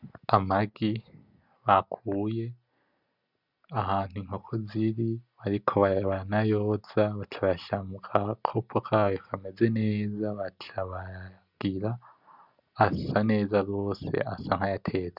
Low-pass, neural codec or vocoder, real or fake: 5.4 kHz; none; real